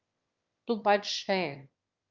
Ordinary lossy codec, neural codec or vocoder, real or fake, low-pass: Opus, 24 kbps; autoencoder, 22.05 kHz, a latent of 192 numbers a frame, VITS, trained on one speaker; fake; 7.2 kHz